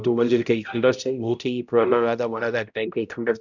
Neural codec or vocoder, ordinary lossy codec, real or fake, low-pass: codec, 16 kHz, 0.5 kbps, X-Codec, HuBERT features, trained on balanced general audio; none; fake; 7.2 kHz